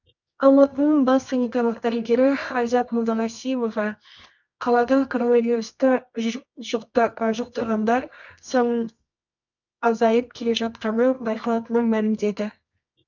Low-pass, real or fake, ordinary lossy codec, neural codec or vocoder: 7.2 kHz; fake; none; codec, 24 kHz, 0.9 kbps, WavTokenizer, medium music audio release